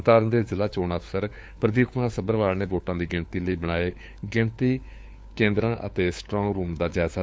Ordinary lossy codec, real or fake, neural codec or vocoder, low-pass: none; fake; codec, 16 kHz, 4 kbps, FunCodec, trained on LibriTTS, 50 frames a second; none